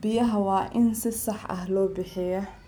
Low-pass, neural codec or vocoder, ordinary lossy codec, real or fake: none; none; none; real